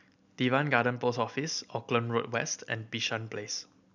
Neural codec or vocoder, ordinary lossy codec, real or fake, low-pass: none; none; real; 7.2 kHz